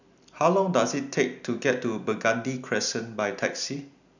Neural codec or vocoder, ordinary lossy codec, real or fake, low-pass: none; none; real; 7.2 kHz